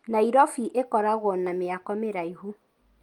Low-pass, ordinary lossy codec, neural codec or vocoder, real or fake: 19.8 kHz; Opus, 32 kbps; none; real